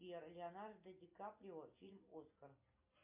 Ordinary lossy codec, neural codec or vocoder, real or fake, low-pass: MP3, 32 kbps; none; real; 3.6 kHz